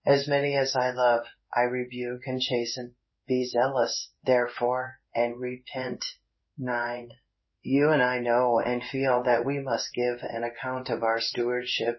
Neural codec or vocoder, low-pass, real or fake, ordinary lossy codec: codec, 16 kHz in and 24 kHz out, 1 kbps, XY-Tokenizer; 7.2 kHz; fake; MP3, 24 kbps